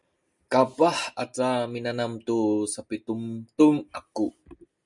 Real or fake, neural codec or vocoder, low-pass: real; none; 10.8 kHz